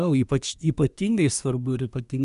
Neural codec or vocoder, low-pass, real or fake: codec, 24 kHz, 1 kbps, SNAC; 10.8 kHz; fake